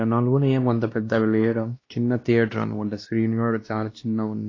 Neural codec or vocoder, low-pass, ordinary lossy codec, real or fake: codec, 16 kHz, 1 kbps, X-Codec, WavLM features, trained on Multilingual LibriSpeech; 7.2 kHz; AAC, 32 kbps; fake